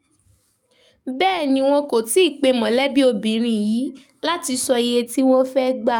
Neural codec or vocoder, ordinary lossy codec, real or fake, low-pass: codec, 44.1 kHz, 7.8 kbps, DAC; none; fake; 19.8 kHz